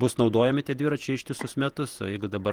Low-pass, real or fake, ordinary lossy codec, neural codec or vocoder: 19.8 kHz; fake; Opus, 24 kbps; vocoder, 48 kHz, 128 mel bands, Vocos